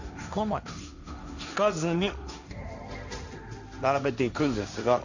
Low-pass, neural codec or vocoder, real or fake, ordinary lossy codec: 7.2 kHz; codec, 16 kHz, 1.1 kbps, Voila-Tokenizer; fake; none